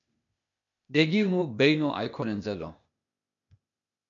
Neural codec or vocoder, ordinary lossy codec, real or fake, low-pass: codec, 16 kHz, 0.8 kbps, ZipCodec; MP3, 96 kbps; fake; 7.2 kHz